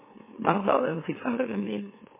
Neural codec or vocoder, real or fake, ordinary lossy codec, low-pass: autoencoder, 44.1 kHz, a latent of 192 numbers a frame, MeloTTS; fake; MP3, 16 kbps; 3.6 kHz